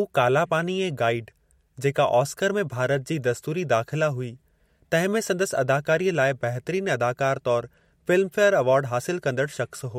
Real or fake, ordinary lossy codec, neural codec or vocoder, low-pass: real; MP3, 64 kbps; none; 19.8 kHz